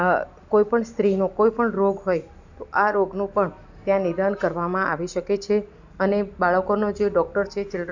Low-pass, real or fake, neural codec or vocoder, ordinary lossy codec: 7.2 kHz; real; none; none